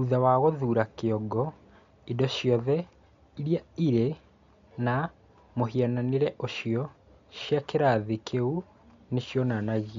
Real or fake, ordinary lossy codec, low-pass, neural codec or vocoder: real; MP3, 48 kbps; 7.2 kHz; none